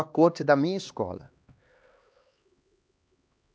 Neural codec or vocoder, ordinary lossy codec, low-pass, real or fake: codec, 16 kHz, 2 kbps, X-Codec, HuBERT features, trained on LibriSpeech; none; none; fake